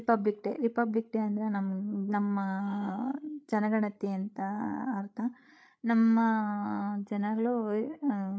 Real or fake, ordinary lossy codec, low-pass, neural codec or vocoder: fake; none; none; codec, 16 kHz, 8 kbps, FreqCodec, larger model